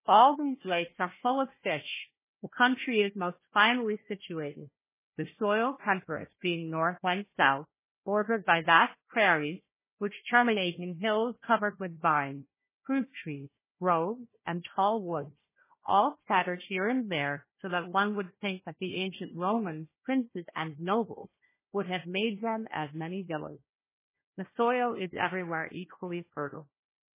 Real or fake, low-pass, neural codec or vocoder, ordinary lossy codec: fake; 3.6 kHz; codec, 16 kHz, 1 kbps, FunCodec, trained on Chinese and English, 50 frames a second; MP3, 16 kbps